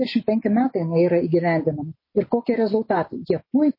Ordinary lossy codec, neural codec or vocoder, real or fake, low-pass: MP3, 24 kbps; none; real; 5.4 kHz